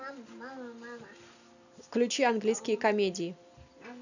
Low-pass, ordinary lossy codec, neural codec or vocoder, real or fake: 7.2 kHz; none; none; real